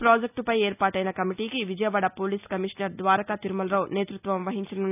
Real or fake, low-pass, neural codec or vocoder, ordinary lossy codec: real; 3.6 kHz; none; none